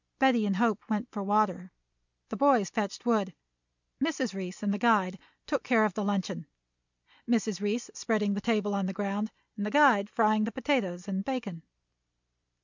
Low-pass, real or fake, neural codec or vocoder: 7.2 kHz; real; none